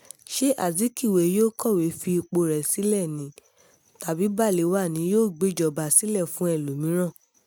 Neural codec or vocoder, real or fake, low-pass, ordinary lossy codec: none; real; none; none